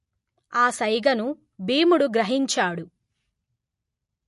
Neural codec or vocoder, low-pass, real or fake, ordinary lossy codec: none; 14.4 kHz; real; MP3, 48 kbps